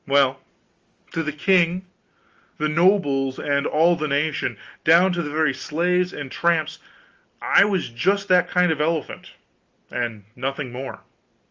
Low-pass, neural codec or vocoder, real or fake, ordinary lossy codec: 7.2 kHz; none; real; Opus, 32 kbps